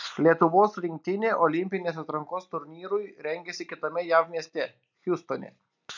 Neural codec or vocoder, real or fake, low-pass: none; real; 7.2 kHz